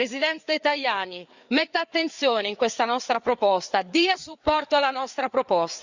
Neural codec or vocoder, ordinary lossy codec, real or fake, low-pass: codec, 16 kHz, 4 kbps, FreqCodec, larger model; Opus, 64 kbps; fake; 7.2 kHz